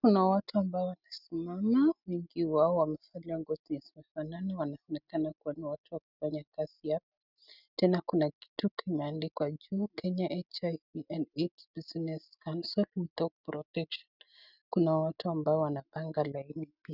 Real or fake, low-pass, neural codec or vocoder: real; 5.4 kHz; none